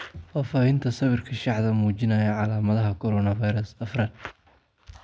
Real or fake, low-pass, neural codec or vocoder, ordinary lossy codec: real; none; none; none